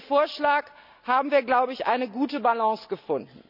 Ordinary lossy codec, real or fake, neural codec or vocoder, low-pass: none; real; none; 5.4 kHz